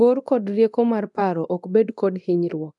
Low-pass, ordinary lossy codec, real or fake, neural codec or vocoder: 10.8 kHz; MP3, 96 kbps; fake; codec, 24 kHz, 0.9 kbps, DualCodec